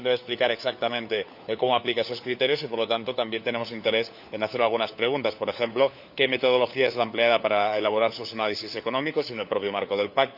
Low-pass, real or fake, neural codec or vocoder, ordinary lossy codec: 5.4 kHz; fake; codec, 16 kHz, 4 kbps, FunCodec, trained on LibriTTS, 50 frames a second; none